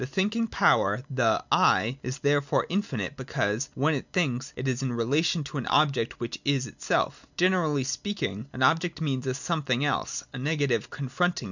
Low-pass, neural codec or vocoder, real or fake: 7.2 kHz; none; real